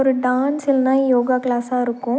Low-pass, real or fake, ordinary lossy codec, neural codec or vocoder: none; real; none; none